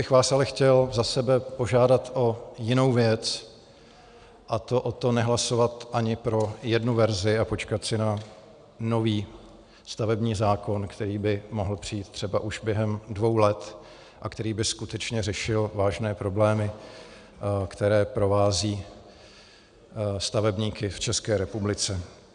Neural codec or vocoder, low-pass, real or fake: none; 9.9 kHz; real